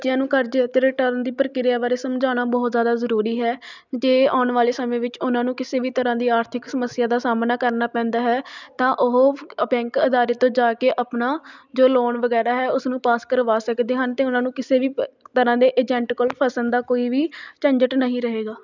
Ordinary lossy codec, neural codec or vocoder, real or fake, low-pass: none; none; real; 7.2 kHz